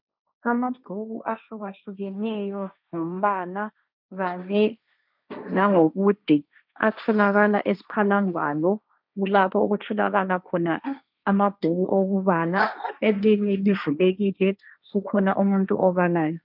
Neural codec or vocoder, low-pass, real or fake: codec, 16 kHz, 1.1 kbps, Voila-Tokenizer; 5.4 kHz; fake